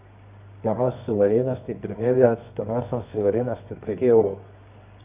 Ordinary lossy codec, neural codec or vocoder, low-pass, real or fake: Opus, 64 kbps; codec, 24 kHz, 0.9 kbps, WavTokenizer, medium music audio release; 3.6 kHz; fake